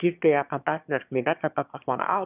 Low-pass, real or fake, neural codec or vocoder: 3.6 kHz; fake; autoencoder, 22.05 kHz, a latent of 192 numbers a frame, VITS, trained on one speaker